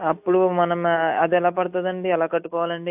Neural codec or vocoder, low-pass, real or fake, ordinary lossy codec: none; 3.6 kHz; real; AAC, 32 kbps